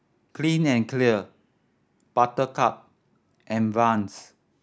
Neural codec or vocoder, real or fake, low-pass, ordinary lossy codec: none; real; none; none